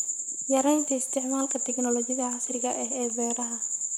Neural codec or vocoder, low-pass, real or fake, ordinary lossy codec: vocoder, 44.1 kHz, 128 mel bands, Pupu-Vocoder; none; fake; none